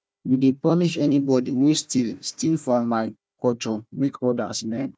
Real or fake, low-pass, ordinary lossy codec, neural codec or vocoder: fake; none; none; codec, 16 kHz, 1 kbps, FunCodec, trained on Chinese and English, 50 frames a second